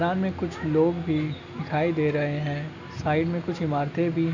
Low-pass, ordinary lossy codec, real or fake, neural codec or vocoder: 7.2 kHz; none; real; none